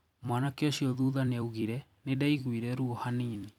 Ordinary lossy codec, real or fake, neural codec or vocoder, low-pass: none; fake; vocoder, 44.1 kHz, 128 mel bands every 256 samples, BigVGAN v2; 19.8 kHz